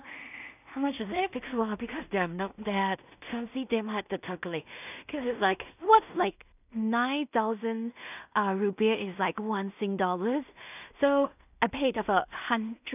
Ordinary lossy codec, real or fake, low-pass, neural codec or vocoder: none; fake; 3.6 kHz; codec, 16 kHz in and 24 kHz out, 0.4 kbps, LongCat-Audio-Codec, two codebook decoder